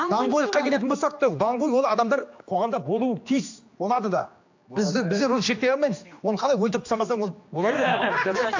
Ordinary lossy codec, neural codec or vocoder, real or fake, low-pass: AAC, 48 kbps; codec, 16 kHz, 2 kbps, X-Codec, HuBERT features, trained on general audio; fake; 7.2 kHz